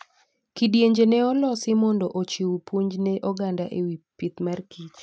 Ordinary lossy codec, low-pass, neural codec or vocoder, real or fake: none; none; none; real